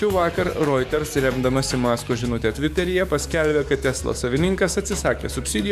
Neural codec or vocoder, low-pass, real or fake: codec, 44.1 kHz, 7.8 kbps, DAC; 14.4 kHz; fake